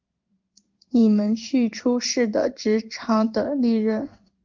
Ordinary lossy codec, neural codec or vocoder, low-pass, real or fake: Opus, 24 kbps; codec, 16 kHz in and 24 kHz out, 1 kbps, XY-Tokenizer; 7.2 kHz; fake